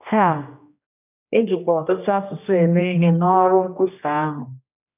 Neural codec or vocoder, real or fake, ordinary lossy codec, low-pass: codec, 16 kHz, 1 kbps, X-Codec, HuBERT features, trained on general audio; fake; none; 3.6 kHz